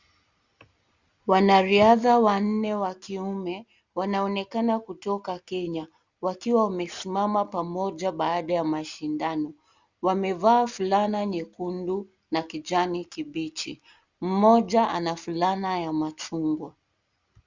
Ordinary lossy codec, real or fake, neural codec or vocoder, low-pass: Opus, 64 kbps; real; none; 7.2 kHz